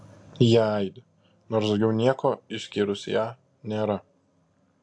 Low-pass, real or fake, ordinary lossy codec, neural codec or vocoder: 9.9 kHz; real; AAC, 64 kbps; none